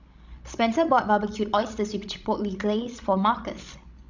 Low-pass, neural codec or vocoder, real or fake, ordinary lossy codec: 7.2 kHz; codec, 16 kHz, 16 kbps, FreqCodec, larger model; fake; none